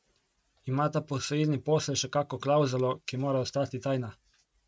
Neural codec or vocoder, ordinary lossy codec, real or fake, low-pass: none; none; real; none